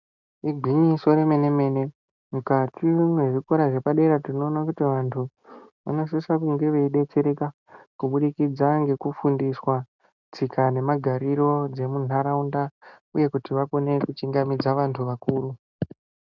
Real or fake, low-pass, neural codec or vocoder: real; 7.2 kHz; none